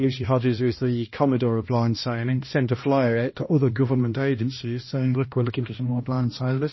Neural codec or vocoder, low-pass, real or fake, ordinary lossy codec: codec, 16 kHz, 1 kbps, X-Codec, HuBERT features, trained on balanced general audio; 7.2 kHz; fake; MP3, 24 kbps